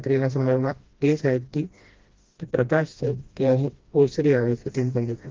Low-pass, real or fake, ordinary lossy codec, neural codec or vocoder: 7.2 kHz; fake; Opus, 32 kbps; codec, 16 kHz, 1 kbps, FreqCodec, smaller model